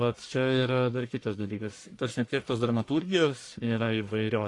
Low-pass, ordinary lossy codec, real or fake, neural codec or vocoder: 10.8 kHz; AAC, 48 kbps; fake; codec, 44.1 kHz, 1.7 kbps, Pupu-Codec